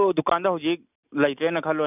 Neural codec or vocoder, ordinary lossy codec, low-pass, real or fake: none; none; 3.6 kHz; real